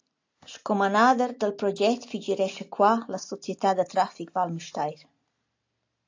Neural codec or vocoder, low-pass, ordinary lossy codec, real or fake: none; 7.2 kHz; AAC, 48 kbps; real